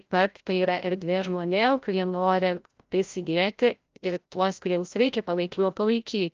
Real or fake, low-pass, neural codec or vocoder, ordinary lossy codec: fake; 7.2 kHz; codec, 16 kHz, 0.5 kbps, FreqCodec, larger model; Opus, 24 kbps